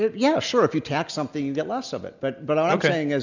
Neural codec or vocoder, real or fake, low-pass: none; real; 7.2 kHz